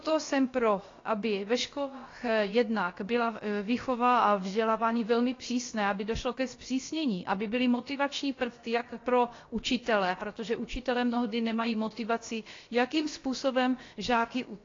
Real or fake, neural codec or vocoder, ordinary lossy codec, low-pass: fake; codec, 16 kHz, about 1 kbps, DyCAST, with the encoder's durations; AAC, 32 kbps; 7.2 kHz